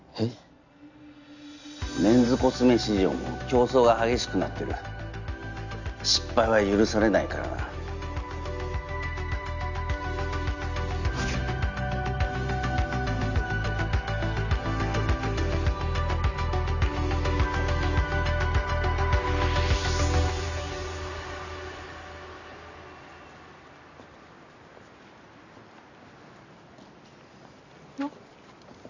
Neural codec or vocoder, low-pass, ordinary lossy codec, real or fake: none; 7.2 kHz; none; real